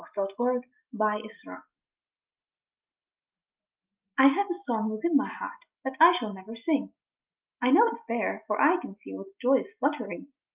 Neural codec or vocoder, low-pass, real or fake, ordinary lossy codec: none; 3.6 kHz; real; Opus, 32 kbps